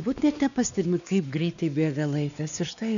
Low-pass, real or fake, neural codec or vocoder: 7.2 kHz; fake; codec, 16 kHz, 2 kbps, X-Codec, WavLM features, trained on Multilingual LibriSpeech